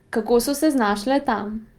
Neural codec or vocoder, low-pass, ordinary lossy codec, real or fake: none; 19.8 kHz; Opus, 32 kbps; real